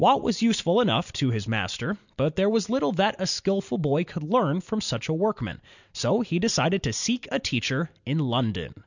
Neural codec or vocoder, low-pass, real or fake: none; 7.2 kHz; real